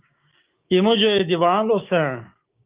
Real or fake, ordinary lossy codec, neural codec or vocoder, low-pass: fake; Opus, 24 kbps; codec, 16 kHz, 6 kbps, DAC; 3.6 kHz